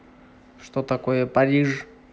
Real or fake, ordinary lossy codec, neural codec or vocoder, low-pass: real; none; none; none